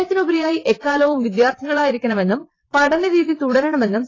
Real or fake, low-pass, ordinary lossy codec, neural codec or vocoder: fake; 7.2 kHz; AAC, 32 kbps; vocoder, 22.05 kHz, 80 mel bands, WaveNeXt